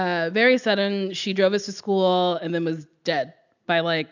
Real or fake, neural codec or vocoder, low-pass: real; none; 7.2 kHz